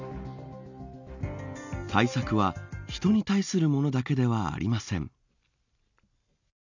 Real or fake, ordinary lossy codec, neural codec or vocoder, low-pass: real; none; none; 7.2 kHz